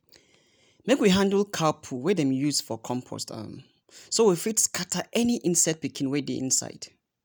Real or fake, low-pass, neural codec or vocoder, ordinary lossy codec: real; none; none; none